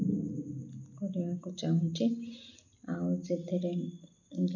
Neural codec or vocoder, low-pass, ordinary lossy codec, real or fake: none; 7.2 kHz; none; real